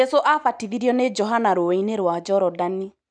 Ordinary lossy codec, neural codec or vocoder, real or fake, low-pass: none; none; real; 9.9 kHz